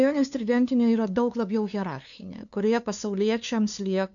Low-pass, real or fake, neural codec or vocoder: 7.2 kHz; fake; codec, 16 kHz, 2 kbps, FunCodec, trained on LibriTTS, 25 frames a second